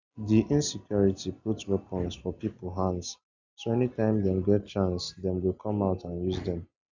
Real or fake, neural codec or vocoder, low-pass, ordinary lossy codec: real; none; 7.2 kHz; none